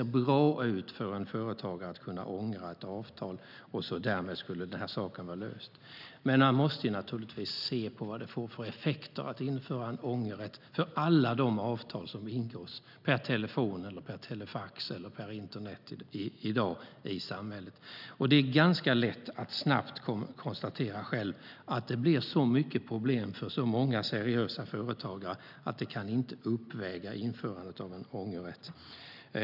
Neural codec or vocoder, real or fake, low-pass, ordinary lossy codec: none; real; 5.4 kHz; none